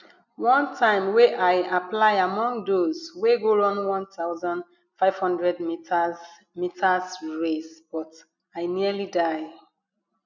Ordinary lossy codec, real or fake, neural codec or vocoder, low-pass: none; real; none; 7.2 kHz